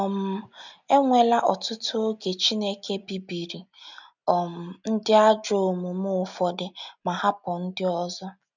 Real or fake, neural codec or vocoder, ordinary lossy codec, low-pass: real; none; none; 7.2 kHz